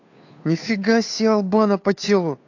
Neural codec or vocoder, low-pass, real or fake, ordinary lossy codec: codec, 16 kHz, 2 kbps, FunCodec, trained on Chinese and English, 25 frames a second; 7.2 kHz; fake; AAC, 48 kbps